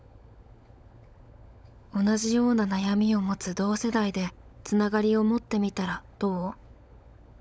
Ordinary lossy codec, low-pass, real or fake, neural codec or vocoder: none; none; fake; codec, 16 kHz, 16 kbps, FunCodec, trained on LibriTTS, 50 frames a second